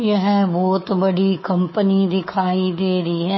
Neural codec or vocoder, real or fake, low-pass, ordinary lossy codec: codec, 24 kHz, 3.1 kbps, DualCodec; fake; 7.2 kHz; MP3, 24 kbps